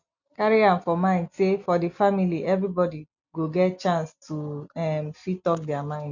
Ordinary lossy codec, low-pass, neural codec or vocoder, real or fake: none; 7.2 kHz; none; real